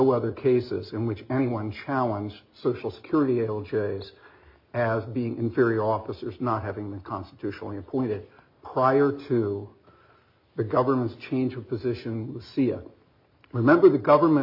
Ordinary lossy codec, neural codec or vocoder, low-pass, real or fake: MP3, 32 kbps; none; 5.4 kHz; real